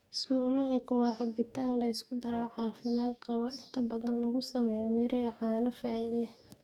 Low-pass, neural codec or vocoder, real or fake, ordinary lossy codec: 19.8 kHz; codec, 44.1 kHz, 2.6 kbps, DAC; fake; none